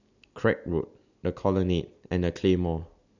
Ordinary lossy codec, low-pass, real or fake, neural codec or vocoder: none; 7.2 kHz; real; none